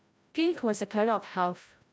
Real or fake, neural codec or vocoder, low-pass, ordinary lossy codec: fake; codec, 16 kHz, 0.5 kbps, FreqCodec, larger model; none; none